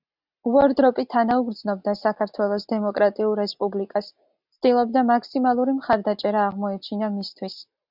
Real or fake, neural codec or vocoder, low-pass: real; none; 5.4 kHz